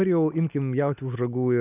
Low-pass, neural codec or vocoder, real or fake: 3.6 kHz; none; real